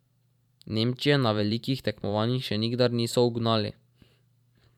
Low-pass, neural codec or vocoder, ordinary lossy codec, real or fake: 19.8 kHz; vocoder, 44.1 kHz, 128 mel bands every 512 samples, BigVGAN v2; none; fake